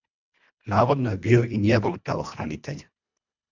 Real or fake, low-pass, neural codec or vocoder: fake; 7.2 kHz; codec, 24 kHz, 1.5 kbps, HILCodec